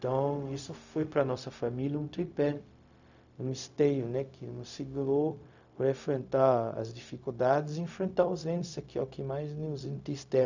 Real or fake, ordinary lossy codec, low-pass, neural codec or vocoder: fake; none; 7.2 kHz; codec, 16 kHz, 0.4 kbps, LongCat-Audio-Codec